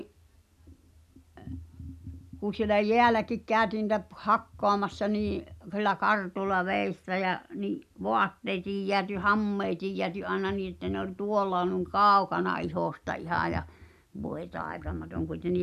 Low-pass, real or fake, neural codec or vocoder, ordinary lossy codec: 14.4 kHz; real; none; none